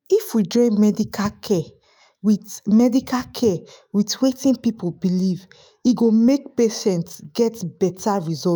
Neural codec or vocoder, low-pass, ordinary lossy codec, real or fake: autoencoder, 48 kHz, 128 numbers a frame, DAC-VAE, trained on Japanese speech; none; none; fake